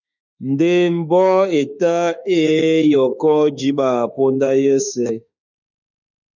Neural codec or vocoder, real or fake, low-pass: autoencoder, 48 kHz, 32 numbers a frame, DAC-VAE, trained on Japanese speech; fake; 7.2 kHz